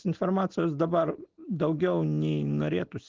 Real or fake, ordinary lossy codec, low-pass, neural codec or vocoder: real; Opus, 16 kbps; 7.2 kHz; none